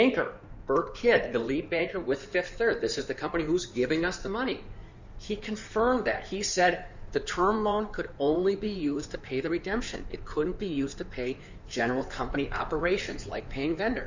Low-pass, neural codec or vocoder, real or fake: 7.2 kHz; codec, 16 kHz in and 24 kHz out, 2.2 kbps, FireRedTTS-2 codec; fake